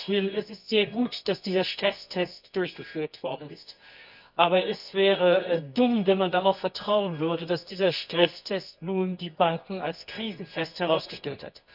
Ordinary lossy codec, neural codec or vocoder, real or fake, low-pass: none; codec, 24 kHz, 0.9 kbps, WavTokenizer, medium music audio release; fake; 5.4 kHz